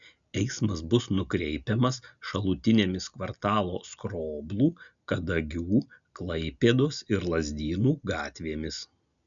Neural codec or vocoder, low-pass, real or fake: none; 7.2 kHz; real